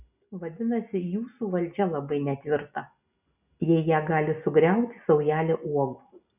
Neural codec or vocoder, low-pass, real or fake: none; 3.6 kHz; real